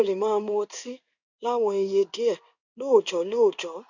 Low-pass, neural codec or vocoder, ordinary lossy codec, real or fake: 7.2 kHz; codec, 16 kHz in and 24 kHz out, 1 kbps, XY-Tokenizer; none; fake